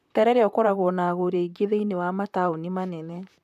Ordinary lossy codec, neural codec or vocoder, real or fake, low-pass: none; vocoder, 44.1 kHz, 128 mel bands, Pupu-Vocoder; fake; 14.4 kHz